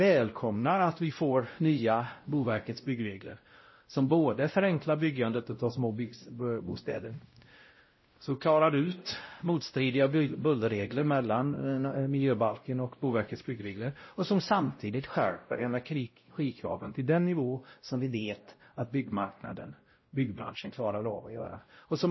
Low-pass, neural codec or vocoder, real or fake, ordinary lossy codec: 7.2 kHz; codec, 16 kHz, 0.5 kbps, X-Codec, WavLM features, trained on Multilingual LibriSpeech; fake; MP3, 24 kbps